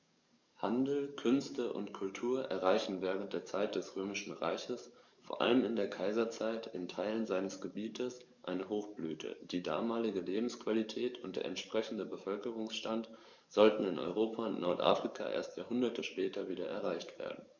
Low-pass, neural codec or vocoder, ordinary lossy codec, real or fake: 7.2 kHz; codec, 44.1 kHz, 7.8 kbps, DAC; none; fake